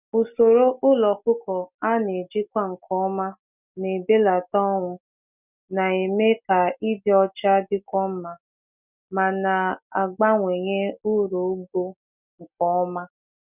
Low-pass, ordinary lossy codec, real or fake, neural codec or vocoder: 3.6 kHz; none; real; none